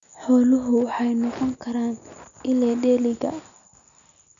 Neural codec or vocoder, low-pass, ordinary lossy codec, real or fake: none; 7.2 kHz; none; real